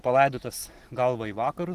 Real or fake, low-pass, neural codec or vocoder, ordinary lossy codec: real; 14.4 kHz; none; Opus, 16 kbps